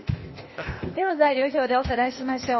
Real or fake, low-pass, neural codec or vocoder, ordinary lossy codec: fake; 7.2 kHz; codec, 16 kHz, 0.8 kbps, ZipCodec; MP3, 24 kbps